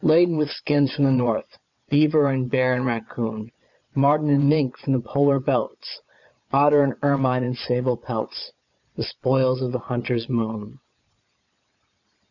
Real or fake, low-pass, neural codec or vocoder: fake; 7.2 kHz; vocoder, 22.05 kHz, 80 mel bands, Vocos